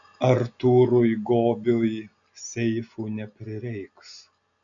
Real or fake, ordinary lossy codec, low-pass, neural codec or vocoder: real; MP3, 96 kbps; 7.2 kHz; none